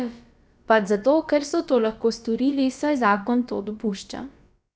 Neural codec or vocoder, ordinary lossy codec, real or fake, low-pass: codec, 16 kHz, about 1 kbps, DyCAST, with the encoder's durations; none; fake; none